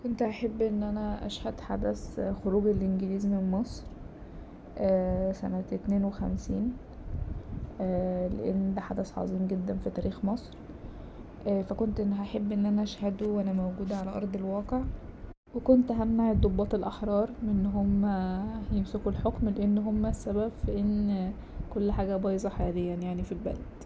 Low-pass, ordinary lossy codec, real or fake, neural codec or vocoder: none; none; real; none